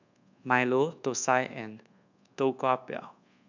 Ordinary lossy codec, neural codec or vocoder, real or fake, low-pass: none; codec, 24 kHz, 1.2 kbps, DualCodec; fake; 7.2 kHz